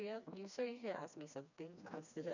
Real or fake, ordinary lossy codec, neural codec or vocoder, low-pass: fake; none; codec, 16 kHz, 2 kbps, FreqCodec, smaller model; 7.2 kHz